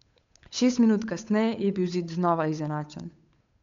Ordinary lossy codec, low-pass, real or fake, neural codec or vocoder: MP3, 64 kbps; 7.2 kHz; fake; codec, 16 kHz, 8 kbps, FunCodec, trained on Chinese and English, 25 frames a second